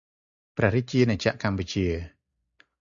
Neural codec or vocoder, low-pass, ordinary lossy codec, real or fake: none; 7.2 kHz; Opus, 64 kbps; real